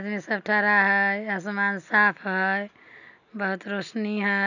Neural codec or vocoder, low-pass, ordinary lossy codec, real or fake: none; 7.2 kHz; none; real